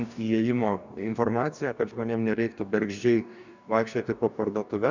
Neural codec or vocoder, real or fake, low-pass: codec, 44.1 kHz, 2.6 kbps, DAC; fake; 7.2 kHz